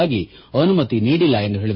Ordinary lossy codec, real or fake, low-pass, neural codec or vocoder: MP3, 24 kbps; fake; 7.2 kHz; codec, 16 kHz, 8 kbps, FreqCodec, smaller model